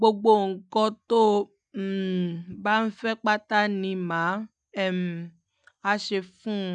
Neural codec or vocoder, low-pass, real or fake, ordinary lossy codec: none; 9.9 kHz; real; none